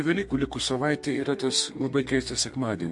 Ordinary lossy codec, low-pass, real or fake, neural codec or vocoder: MP3, 48 kbps; 10.8 kHz; fake; codec, 32 kHz, 1.9 kbps, SNAC